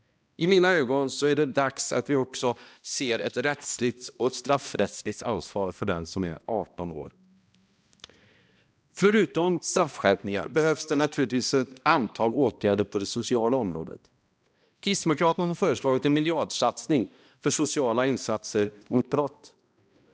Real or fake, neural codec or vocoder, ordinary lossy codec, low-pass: fake; codec, 16 kHz, 1 kbps, X-Codec, HuBERT features, trained on balanced general audio; none; none